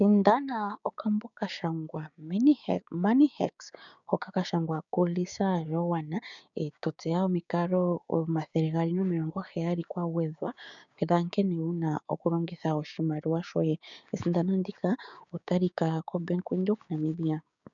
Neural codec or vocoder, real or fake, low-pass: codec, 16 kHz, 6 kbps, DAC; fake; 7.2 kHz